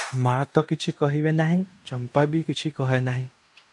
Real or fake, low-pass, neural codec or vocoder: fake; 10.8 kHz; codec, 16 kHz in and 24 kHz out, 0.9 kbps, LongCat-Audio-Codec, fine tuned four codebook decoder